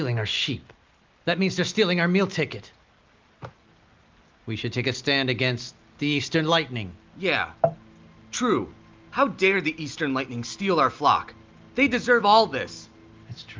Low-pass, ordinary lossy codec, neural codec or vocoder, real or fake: 7.2 kHz; Opus, 24 kbps; none; real